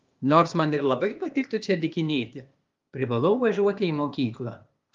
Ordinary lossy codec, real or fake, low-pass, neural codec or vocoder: Opus, 32 kbps; fake; 7.2 kHz; codec, 16 kHz, 0.8 kbps, ZipCodec